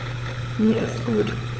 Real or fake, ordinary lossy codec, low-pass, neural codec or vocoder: fake; none; none; codec, 16 kHz, 16 kbps, FunCodec, trained on LibriTTS, 50 frames a second